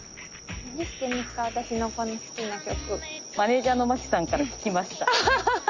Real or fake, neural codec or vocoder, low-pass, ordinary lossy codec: real; none; 7.2 kHz; Opus, 32 kbps